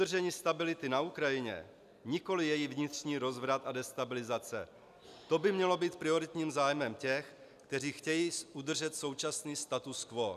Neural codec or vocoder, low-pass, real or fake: none; 14.4 kHz; real